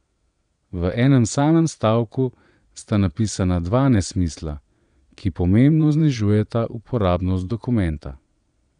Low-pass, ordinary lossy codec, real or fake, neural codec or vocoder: 9.9 kHz; none; fake; vocoder, 22.05 kHz, 80 mel bands, Vocos